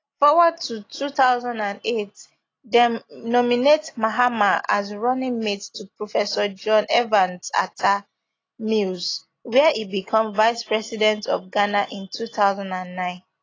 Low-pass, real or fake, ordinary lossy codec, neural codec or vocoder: 7.2 kHz; real; AAC, 32 kbps; none